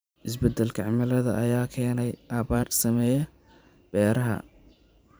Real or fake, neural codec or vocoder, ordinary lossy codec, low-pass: fake; vocoder, 44.1 kHz, 128 mel bands every 512 samples, BigVGAN v2; none; none